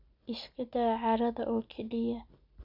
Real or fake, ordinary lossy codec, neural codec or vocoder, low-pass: real; none; none; 5.4 kHz